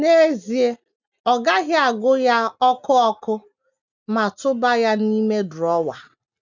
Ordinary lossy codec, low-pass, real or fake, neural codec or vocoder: none; 7.2 kHz; real; none